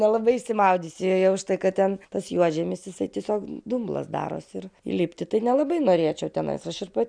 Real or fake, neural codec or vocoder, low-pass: real; none; 9.9 kHz